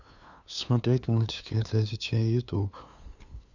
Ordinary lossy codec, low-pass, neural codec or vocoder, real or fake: none; 7.2 kHz; codec, 16 kHz, 2 kbps, FunCodec, trained on LibriTTS, 25 frames a second; fake